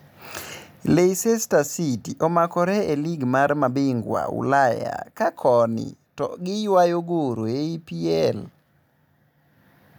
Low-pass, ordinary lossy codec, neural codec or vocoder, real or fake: none; none; none; real